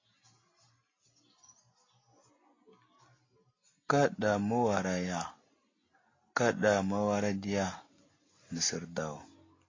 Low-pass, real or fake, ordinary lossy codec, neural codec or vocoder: 7.2 kHz; real; AAC, 32 kbps; none